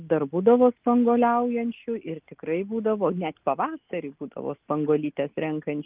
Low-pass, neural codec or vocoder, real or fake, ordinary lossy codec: 3.6 kHz; none; real; Opus, 24 kbps